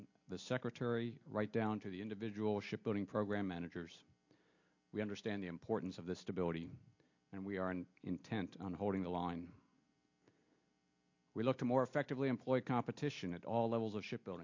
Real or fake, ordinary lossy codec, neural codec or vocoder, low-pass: real; MP3, 48 kbps; none; 7.2 kHz